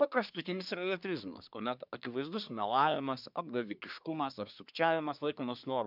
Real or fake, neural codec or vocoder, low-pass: fake; codec, 24 kHz, 1 kbps, SNAC; 5.4 kHz